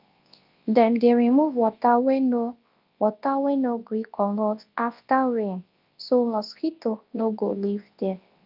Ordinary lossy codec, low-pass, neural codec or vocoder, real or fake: Opus, 32 kbps; 5.4 kHz; codec, 24 kHz, 0.9 kbps, WavTokenizer, large speech release; fake